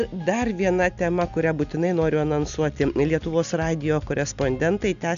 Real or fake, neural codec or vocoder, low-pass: real; none; 7.2 kHz